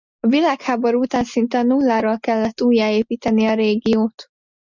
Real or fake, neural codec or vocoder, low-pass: real; none; 7.2 kHz